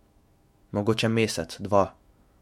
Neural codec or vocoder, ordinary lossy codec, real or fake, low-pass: autoencoder, 48 kHz, 128 numbers a frame, DAC-VAE, trained on Japanese speech; MP3, 64 kbps; fake; 19.8 kHz